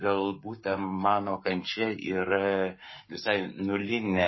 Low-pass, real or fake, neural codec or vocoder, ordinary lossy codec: 7.2 kHz; fake; codec, 44.1 kHz, 7.8 kbps, Pupu-Codec; MP3, 24 kbps